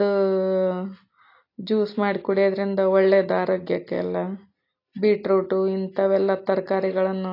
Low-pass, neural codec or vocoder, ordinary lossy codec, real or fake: 5.4 kHz; none; AAC, 32 kbps; real